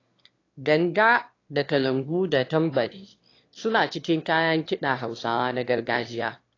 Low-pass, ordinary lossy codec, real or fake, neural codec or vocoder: 7.2 kHz; AAC, 32 kbps; fake; autoencoder, 22.05 kHz, a latent of 192 numbers a frame, VITS, trained on one speaker